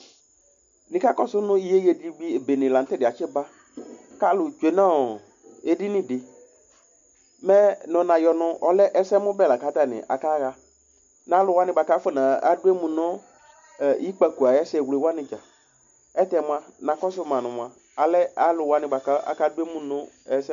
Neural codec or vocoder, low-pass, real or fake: none; 7.2 kHz; real